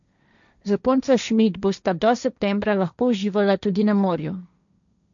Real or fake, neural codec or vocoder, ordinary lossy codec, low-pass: fake; codec, 16 kHz, 1.1 kbps, Voila-Tokenizer; none; 7.2 kHz